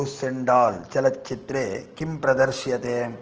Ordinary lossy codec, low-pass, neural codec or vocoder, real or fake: Opus, 16 kbps; 7.2 kHz; none; real